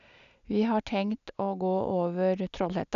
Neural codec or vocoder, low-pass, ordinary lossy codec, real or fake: none; 7.2 kHz; none; real